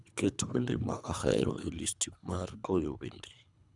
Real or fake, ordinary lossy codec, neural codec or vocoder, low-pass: fake; none; codec, 24 kHz, 3 kbps, HILCodec; 10.8 kHz